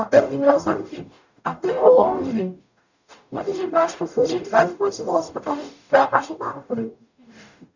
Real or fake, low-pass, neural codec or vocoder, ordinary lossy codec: fake; 7.2 kHz; codec, 44.1 kHz, 0.9 kbps, DAC; none